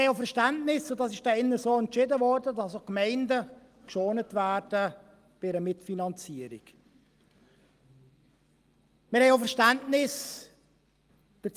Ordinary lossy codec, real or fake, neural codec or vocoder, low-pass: Opus, 32 kbps; real; none; 14.4 kHz